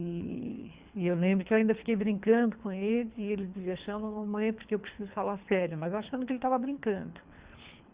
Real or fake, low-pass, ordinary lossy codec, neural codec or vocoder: fake; 3.6 kHz; Opus, 64 kbps; codec, 24 kHz, 3 kbps, HILCodec